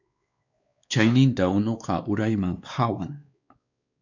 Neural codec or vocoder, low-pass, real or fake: codec, 16 kHz, 2 kbps, X-Codec, WavLM features, trained on Multilingual LibriSpeech; 7.2 kHz; fake